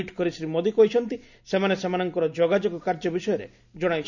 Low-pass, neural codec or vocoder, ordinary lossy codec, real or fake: 7.2 kHz; none; none; real